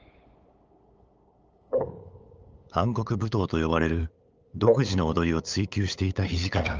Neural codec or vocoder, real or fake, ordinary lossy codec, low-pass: codec, 16 kHz, 8 kbps, FunCodec, trained on LibriTTS, 25 frames a second; fake; Opus, 24 kbps; 7.2 kHz